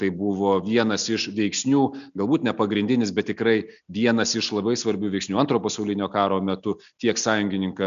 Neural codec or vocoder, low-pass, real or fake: none; 7.2 kHz; real